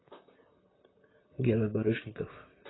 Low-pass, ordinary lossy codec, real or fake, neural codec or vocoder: 7.2 kHz; AAC, 16 kbps; fake; vocoder, 44.1 kHz, 128 mel bands, Pupu-Vocoder